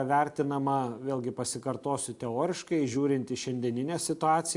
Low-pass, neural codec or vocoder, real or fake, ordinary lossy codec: 10.8 kHz; none; real; AAC, 64 kbps